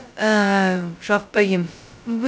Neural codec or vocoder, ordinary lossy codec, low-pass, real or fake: codec, 16 kHz, 0.2 kbps, FocalCodec; none; none; fake